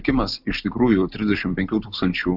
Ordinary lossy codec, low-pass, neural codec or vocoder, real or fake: AAC, 48 kbps; 5.4 kHz; none; real